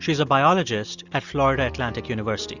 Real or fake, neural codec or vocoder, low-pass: real; none; 7.2 kHz